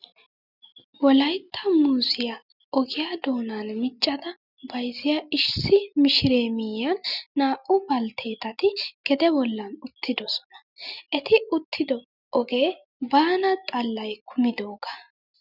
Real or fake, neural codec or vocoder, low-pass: real; none; 5.4 kHz